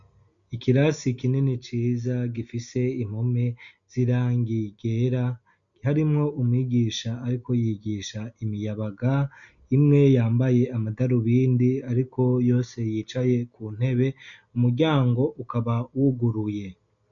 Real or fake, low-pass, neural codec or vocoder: real; 7.2 kHz; none